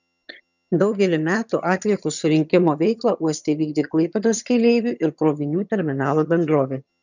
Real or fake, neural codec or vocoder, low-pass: fake; vocoder, 22.05 kHz, 80 mel bands, HiFi-GAN; 7.2 kHz